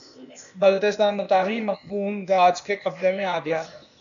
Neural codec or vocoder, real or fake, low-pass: codec, 16 kHz, 0.8 kbps, ZipCodec; fake; 7.2 kHz